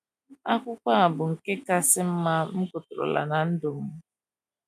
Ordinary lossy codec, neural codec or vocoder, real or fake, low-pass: MP3, 96 kbps; none; real; 14.4 kHz